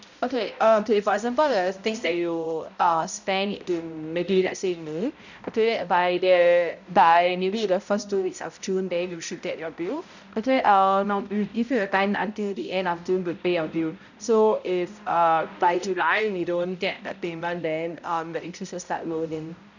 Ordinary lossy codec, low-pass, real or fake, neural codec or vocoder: none; 7.2 kHz; fake; codec, 16 kHz, 0.5 kbps, X-Codec, HuBERT features, trained on balanced general audio